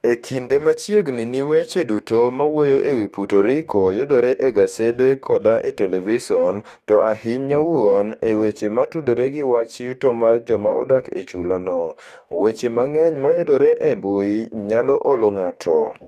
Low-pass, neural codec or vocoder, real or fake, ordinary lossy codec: 14.4 kHz; codec, 44.1 kHz, 2.6 kbps, DAC; fake; none